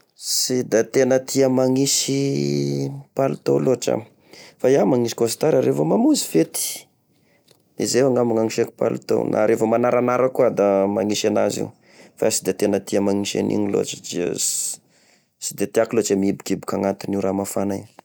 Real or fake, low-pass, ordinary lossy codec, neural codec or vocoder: real; none; none; none